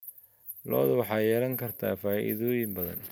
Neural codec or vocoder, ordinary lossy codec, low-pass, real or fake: none; none; none; real